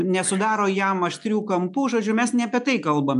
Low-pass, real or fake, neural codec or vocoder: 10.8 kHz; real; none